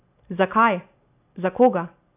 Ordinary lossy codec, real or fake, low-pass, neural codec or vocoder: AAC, 32 kbps; real; 3.6 kHz; none